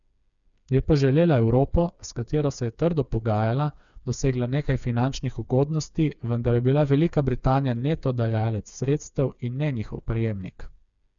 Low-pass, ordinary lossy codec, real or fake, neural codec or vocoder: 7.2 kHz; none; fake; codec, 16 kHz, 4 kbps, FreqCodec, smaller model